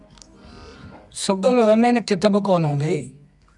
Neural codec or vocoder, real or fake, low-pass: codec, 24 kHz, 0.9 kbps, WavTokenizer, medium music audio release; fake; 10.8 kHz